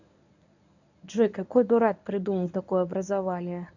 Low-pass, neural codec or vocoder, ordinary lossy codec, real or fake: 7.2 kHz; codec, 24 kHz, 0.9 kbps, WavTokenizer, medium speech release version 1; none; fake